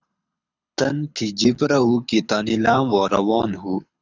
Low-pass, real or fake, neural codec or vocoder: 7.2 kHz; fake; codec, 24 kHz, 6 kbps, HILCodec